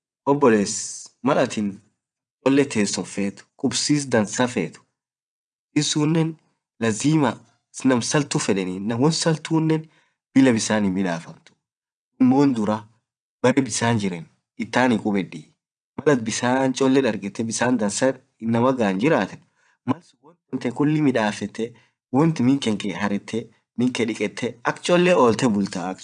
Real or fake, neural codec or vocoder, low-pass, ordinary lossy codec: fake; vocoder, 22.05 kHz, 80 mel bands, WaveNeXt; 9.9 kHz; none